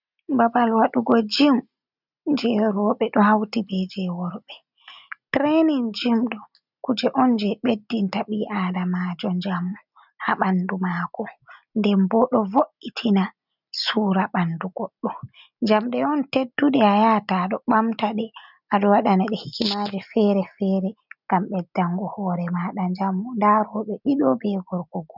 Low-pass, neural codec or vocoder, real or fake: 5.4 kHz; none; real